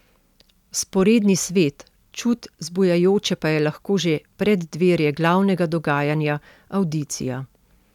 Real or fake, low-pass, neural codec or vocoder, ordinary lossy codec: real; 19.8 kHz; none; none